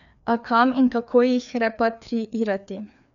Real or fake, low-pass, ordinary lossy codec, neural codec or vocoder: fake; 7.2 kHz; none; codec, 16 kHz, 2 kbps, FreqCodec, larger model